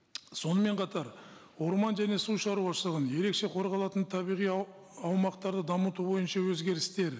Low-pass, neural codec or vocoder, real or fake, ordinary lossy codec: none; none; real; none